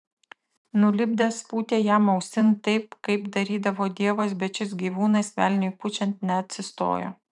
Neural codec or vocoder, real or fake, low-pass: vocoder, 44.1 kHz, 128 mel bands every 512 samples, BigVGAN v2; fake; 10.8 kHz